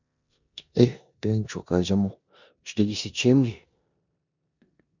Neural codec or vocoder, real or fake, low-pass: codec, 16 kHz in and 24 kHz out, 0.9 kbps, LongCat-Audio-Codec, four codebook decoder; fake; 7.2 kHz